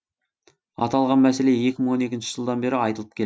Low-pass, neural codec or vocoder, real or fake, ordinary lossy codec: none; none; real; none